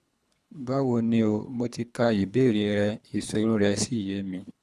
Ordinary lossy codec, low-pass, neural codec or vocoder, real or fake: none; none; codec, 24 kHz, 3 kbps, HILCodec; fake